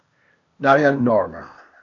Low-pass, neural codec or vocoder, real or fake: 7.2 kHz; codec, 16 kHz, 0.8 kbps, ZipCodec; fake